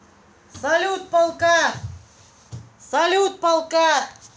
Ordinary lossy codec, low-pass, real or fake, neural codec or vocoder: none; none; real; none